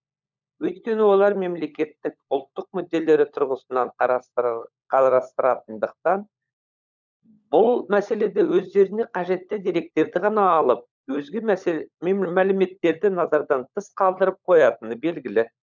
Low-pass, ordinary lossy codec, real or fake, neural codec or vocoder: 7.2 kHz; none; fake; codec, 16 kHz, 16 kbps, FunCodec, trained on LibriTTS, 50 frames a second